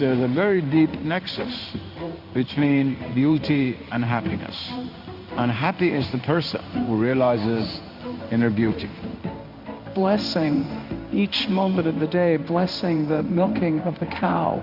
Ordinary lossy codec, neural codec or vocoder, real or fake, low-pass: Opus, 64 kbps; codec, 16 kHz in and 24 kHz out, 1 kbps, XY-Tokenizer; fake; 5.4 kHz